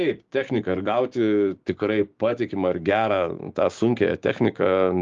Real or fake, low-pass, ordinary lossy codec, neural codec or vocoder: fake; 7.2 kHz; Opus, 32 kbps; codec, 16 kHz, 6 kbps, DAC